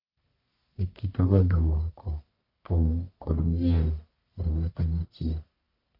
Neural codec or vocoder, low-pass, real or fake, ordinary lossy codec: codec, 44.1 kHz, 1.7 kbps, Pupu-Codec; 5.4 kHz; fake; none